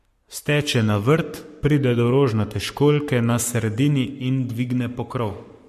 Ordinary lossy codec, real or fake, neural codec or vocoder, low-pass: MP3, 64 kbps; fake; codec, 44.1 kHz, 7.8 kbps, Pupu-Codec; 14.4 kHz